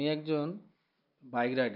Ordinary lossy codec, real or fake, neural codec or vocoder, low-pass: none; real; none; 5.4 kHz